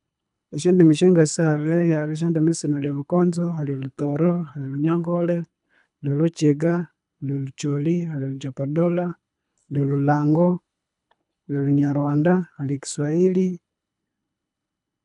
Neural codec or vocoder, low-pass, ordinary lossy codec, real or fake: codec, 24 kHz, 3 kbps, HILCodec; 10.8 kHz; none; fake